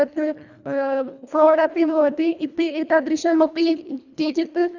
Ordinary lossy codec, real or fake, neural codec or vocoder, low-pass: none; fake; codec, 24 kHz, 1.5 kbps, HILCodec; 7.2 kHz